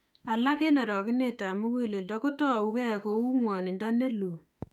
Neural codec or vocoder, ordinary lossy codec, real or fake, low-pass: autoencoder, 48 kHz, 32 numbers a frame, DAC-VAE, trained on Japanese speech; none; fake; 19.8 kHz